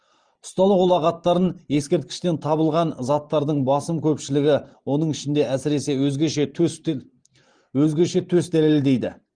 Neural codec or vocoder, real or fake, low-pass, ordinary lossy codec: vocoder, 44.1 kHz, 128 mel bands every 512 samples, BigVGAN v2; fake; 9.9 kHz; Opus, 24 kbps